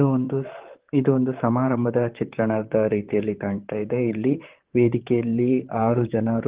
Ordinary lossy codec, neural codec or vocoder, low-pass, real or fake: Opus, 16 kbps; vocoder, 44.1 kHz, 128 mel bands, Pupu-Vocoder; 3.6 kHz; fake